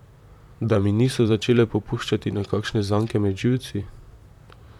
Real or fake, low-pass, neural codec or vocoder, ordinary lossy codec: fake; 19.8 kHz; vocoder, 44.1 kHz, 128 mel bands, Pupu-Vocoder; none